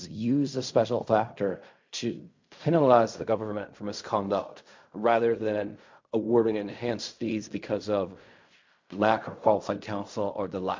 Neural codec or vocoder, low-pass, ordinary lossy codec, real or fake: codec, 16 kHz in and 24 kHz out, 0.4 kbps, LongCat-Audio-Codec, fine tuned four codebook decoder; 7.2 kHz; MP3, 48 kbps; fake